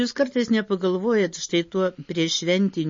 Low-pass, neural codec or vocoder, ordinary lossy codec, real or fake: 7.2 kHz; none; MP3, 32 kbps; real